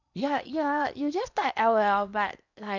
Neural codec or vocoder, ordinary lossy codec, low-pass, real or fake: codec, 16 kHz in and 24 kHz out, 0.8 kbps, FocalCodec, streaming, 65536 codes; none; 7.2 kHz; fake